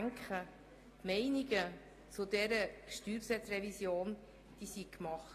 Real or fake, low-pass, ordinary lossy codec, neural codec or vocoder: real; 14.4 kHz; AAC, 48 kbps; none